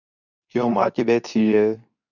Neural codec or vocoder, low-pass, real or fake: codec, 24 kHz, 0.9 kbps, WavTokenizer, medium speech release version 2; 7.2 kHz; fake